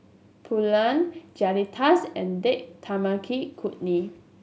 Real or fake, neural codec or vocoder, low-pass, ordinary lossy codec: real; none; none; none